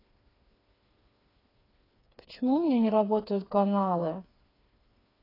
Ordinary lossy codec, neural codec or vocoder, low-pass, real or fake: AAC, 24 kbps; codec, 16 kHz, 4 kbps, FreqCodec, smaller model; 5.4 kHz; fake